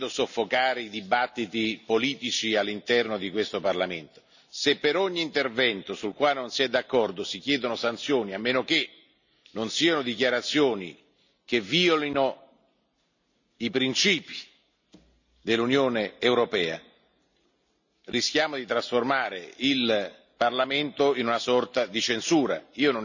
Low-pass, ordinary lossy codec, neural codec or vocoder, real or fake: 7.2 kHz; none; none; real